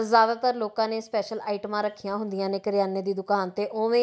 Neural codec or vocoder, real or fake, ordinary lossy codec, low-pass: none; real; none; none